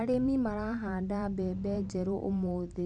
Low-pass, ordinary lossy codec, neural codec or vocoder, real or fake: 10.8 kHz; none; vocoder, 44.1 kHz, 128 mel bands every 512 samples, BigVGAN v2; fake